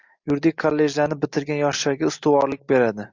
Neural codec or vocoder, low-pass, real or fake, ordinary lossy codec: none; 7.2 kHz; real; MP3, 64 kbps